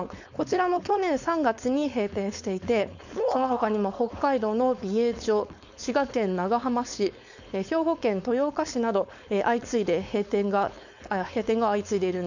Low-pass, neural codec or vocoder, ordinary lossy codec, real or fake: 7.2 kHz; codec, 16 kHz, 4.8 kbps, FACodec; none; fake